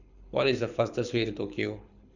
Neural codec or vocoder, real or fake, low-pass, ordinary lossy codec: codec, 24 kHz, 6 kbps, HILCodec; fake; 7.2 kHz; none